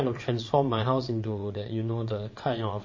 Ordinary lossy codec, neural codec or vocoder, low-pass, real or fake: MP3, 32 kbps; vocoder, 22.05 kHz, 80 mel bands, WaveNeXt; 7.2 kHz; fake